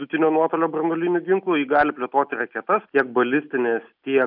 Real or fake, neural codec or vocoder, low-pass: real; none; 5.4 kHz